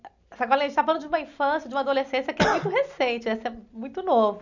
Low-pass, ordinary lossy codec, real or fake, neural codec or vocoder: 7.2 kHz; none; real; none